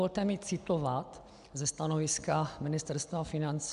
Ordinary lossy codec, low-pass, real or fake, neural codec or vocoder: MP3, 96 kbps; 10.8 kHz; real; none